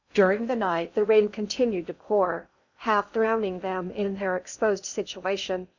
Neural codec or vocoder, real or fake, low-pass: codec, 16 kHz in and 24 kHz out, 0.6 kbps, FocalCodec, streaming, 2048 codes; fake; 7.2 kHz